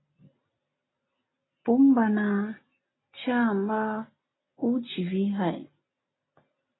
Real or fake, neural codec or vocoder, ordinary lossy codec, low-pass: real; none; AAC, 16 kbps; 7.2 kHz